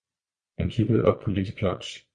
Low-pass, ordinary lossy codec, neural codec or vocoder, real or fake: 9.9 kHz; Opus, 64 kbps; vocoder, 22.05 kHz, 80 mel bands, Vocos; fake